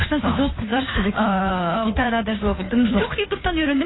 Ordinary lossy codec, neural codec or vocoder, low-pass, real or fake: AAC, 16 kbps; codec, 16 kHz, 2 kbps, FunCodec, trained on Chinese and English, 25 frames a second; 7.2 kHz; fake